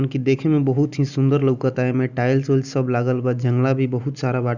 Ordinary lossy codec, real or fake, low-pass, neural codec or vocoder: none; real; 7.2 kHz; none